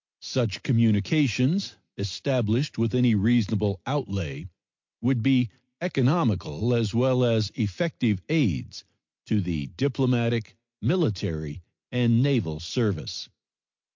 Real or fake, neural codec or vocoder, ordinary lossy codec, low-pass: real; none; MP3, 48 kbps; 7.2 kHz